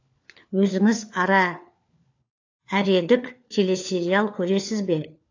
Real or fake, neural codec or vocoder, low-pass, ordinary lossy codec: fake; codec, 16 kHz, 4 kbps, FunCodec, trained on LibriTTS, 50 frames a second; 7.2 kHz; AAC, 48 kbps